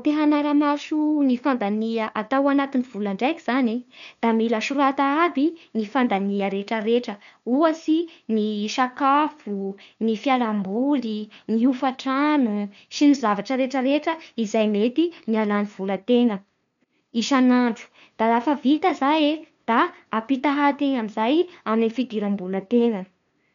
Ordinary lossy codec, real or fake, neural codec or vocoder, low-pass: none; fake; codec, 16 kHz, 2 kbps, FunCodec, trained on LibriTTS, 25 frames a second; 7.2 kHz